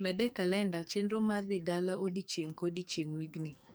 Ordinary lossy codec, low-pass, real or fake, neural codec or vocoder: none; none; fake; codec, 44.1 kHz, 2.6 kbps, SNAC